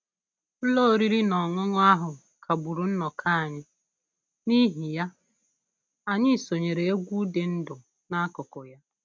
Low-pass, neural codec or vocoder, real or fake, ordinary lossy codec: none; none; real; none